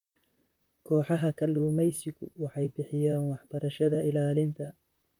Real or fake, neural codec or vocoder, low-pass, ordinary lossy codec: fake; vocoder, 44.1 kHz, 128 mel bands, Pupu-Vocoder; 19.8 kHz; none